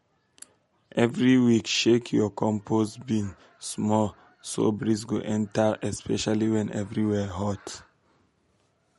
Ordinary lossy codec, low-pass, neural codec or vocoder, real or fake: MP3, 48 kbps; 19.8 kHz; none; real